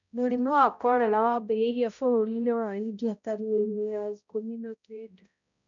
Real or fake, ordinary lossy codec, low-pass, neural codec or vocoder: fake; none; 7.2 kHz; codec, 16 kHz, 0.5 kbps, X-Codec, HuBERT features, trained on balanced general audio